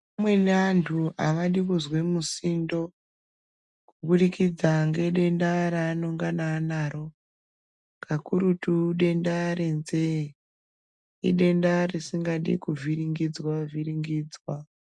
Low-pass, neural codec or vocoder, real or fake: 10.8 kHz; none; real